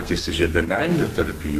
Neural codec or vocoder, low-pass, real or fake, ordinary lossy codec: codec, 32 kHz, 1.9 kbps, SNAC; 14.4 kHz; fake; AAC, 64 kbps